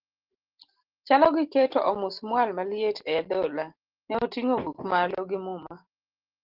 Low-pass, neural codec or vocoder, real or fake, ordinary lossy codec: 5.4 kHz; none; real; Opus, 16 kbps